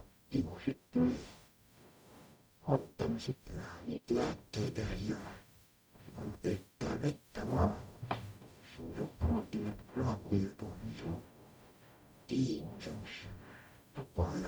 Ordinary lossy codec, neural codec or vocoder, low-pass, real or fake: none; codec, 44.1 kHz, 0.9 kbps, DAC; none; fake